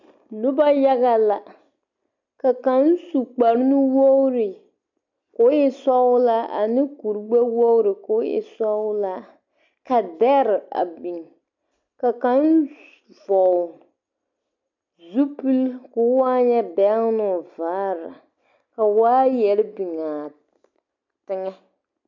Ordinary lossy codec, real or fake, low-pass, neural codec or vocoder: MP3, 64 kbps; real; 7.2 kHz; none